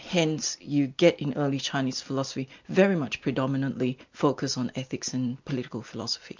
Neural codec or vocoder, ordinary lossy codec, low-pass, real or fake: none; MP3, 64 kbps; 7.2 kHz; real